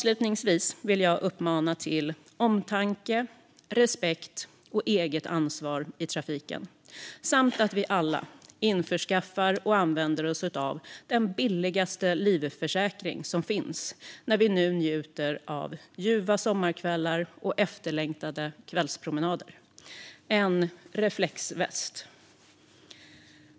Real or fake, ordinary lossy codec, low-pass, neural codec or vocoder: real; none; none; none